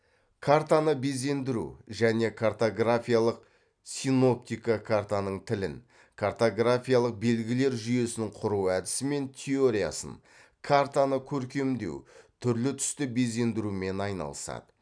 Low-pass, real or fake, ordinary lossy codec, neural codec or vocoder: 9.9 kHz; real; none; none